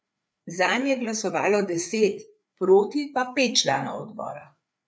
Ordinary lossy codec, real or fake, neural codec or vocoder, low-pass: none; fake; codec, 16 kHz, 4 kbps, FreqCodec, larger model; none